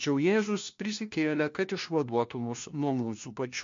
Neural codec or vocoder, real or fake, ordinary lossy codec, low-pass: codec, 16 kHz, 1 kbps, FunCodec, trained on LibriTTS, 50 frames a second; fake; MP3, 48 kbps; 7.2 kHz